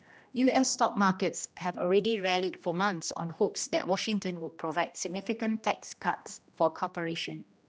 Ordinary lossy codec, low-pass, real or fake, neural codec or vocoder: none; none; fake; codec, 16 kHz, 1 kbps, X-Codec, HuBERT features, trained on general audio